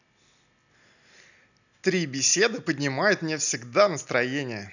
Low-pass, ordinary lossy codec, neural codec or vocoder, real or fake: 7.2 kHz; none; none; real